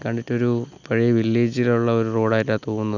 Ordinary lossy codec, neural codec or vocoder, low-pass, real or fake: none; none; 7.2 kHz; real